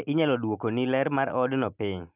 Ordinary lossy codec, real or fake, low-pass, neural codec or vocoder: none; real; 3.6 kHz; none